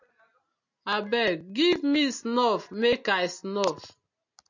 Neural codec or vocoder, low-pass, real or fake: none; 7.2 kHz; real